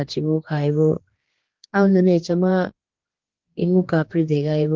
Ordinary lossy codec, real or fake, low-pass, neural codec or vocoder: Opus, 16 kbps; fake; 7.2 kHz; codec, 16 kHz, 2 kbps, X-Codec, HuBERT features, trained on general audio